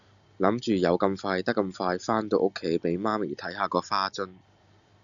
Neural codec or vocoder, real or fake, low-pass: none; real; 7.2 kHz